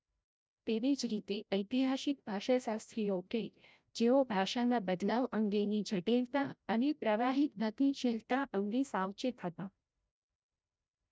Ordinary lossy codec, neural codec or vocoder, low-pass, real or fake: none; codec, 16 kHz, 0.5 kbps, FreqCodec, larger model; none; fake